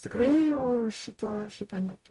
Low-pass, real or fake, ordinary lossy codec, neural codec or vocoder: 14.4 kHz; fake; MP3, 48 kbps; codec, 44.1 kHz, 0.9 kbps, DAC